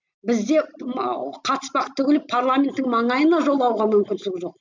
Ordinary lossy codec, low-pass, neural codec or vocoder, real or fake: MP3, 64 kbps; 7.2 kHz; none; real